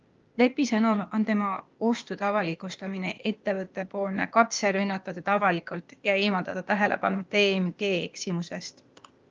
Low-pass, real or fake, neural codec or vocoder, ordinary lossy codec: 7.2 kHz; fake; codec, 16 kHz, 0.8 kbps, ZipCodec; Opus, 24 kbps